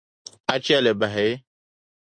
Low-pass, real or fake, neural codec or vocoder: 9.9 kHz; real; none